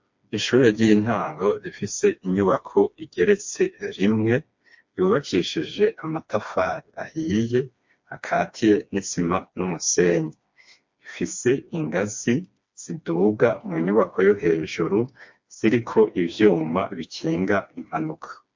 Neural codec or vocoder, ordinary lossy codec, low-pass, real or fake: codec, 16 kHz, 2 kbps, FreqCodec, smaller model; MP3, 48 kbps; 7.2 kHz; fake